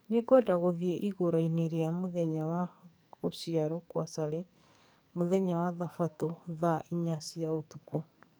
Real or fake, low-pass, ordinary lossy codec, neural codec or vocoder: fake; none; none; codec, 44.1 kHz, 2.6 kbps, SNAC